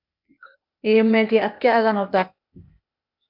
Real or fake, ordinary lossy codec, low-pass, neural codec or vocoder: fake; MP3, 48 kbps; 5.4 kHz; codec, 16 kHz, 0.8 kbps, ZipCodec